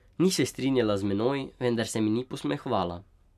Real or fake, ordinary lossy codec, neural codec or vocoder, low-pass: real; none; none; 14.4 kHz